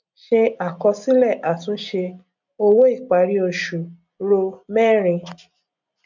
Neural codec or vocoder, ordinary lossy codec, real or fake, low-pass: none; none; real; 7.2 kHz